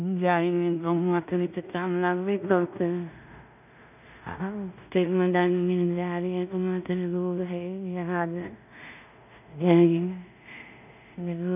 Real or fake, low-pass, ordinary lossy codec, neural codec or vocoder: fake; 3.6 kHz; none; codec, 16 kHz in and 24 kHz out, 0.4 kbps, LongCat-Audio-Codec, two codebook decoder